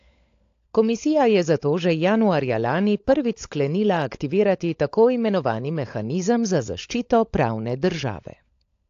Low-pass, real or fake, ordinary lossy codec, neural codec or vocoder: 7.2 kHz; fake; AAC, 48 kbps; codec, 16 kHz, 16 kbps, FunCodec, trained on LibriTTS, 50 frames a second